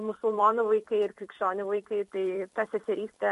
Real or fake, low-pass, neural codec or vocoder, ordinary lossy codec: fake; 14.4 kHz; vocoder, 44.1 kHz, 128 mel bands every 256 samples, BigVGAN v2; MP3, 48 kbps